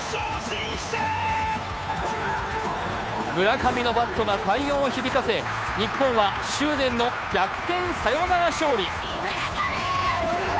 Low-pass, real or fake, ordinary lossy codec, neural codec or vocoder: none; fake; none; codec, 16 kHz, 2 kbps, FunCodec, trained on Chinese and English, 25 frames a second